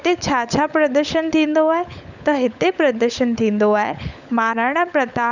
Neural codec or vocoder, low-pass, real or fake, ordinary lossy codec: codec, 16 kHz, 16 kbps, FunCodec, trained on LibriTTS, 50 frames a second; 7.2 kHz; fake; none